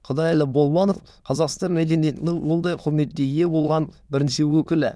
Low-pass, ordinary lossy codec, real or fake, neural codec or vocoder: none; none; fake; autoencoder, 22.05 kHz, a latent of 192 numbers a frame, VITS, trained on many speakers